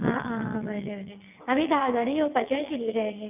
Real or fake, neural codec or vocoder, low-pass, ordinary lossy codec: fake; vocoder, 22.05 kHz, 80 mel bands, WaveNeXt; 3.6 kHz; none